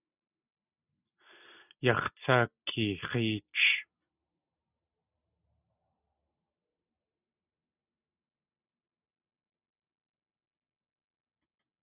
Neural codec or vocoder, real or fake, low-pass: none; real; 3.6 kHz